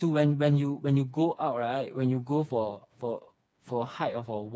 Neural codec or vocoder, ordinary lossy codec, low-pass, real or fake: codec, 16 kHz, 4 kbps, FreqCodec, smaller model; none; none; fake